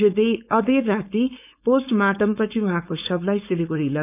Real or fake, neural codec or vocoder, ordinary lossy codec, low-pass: fake; codec, 16 kHz, 4.8 kbps, FACodec; none; 3.6 kHz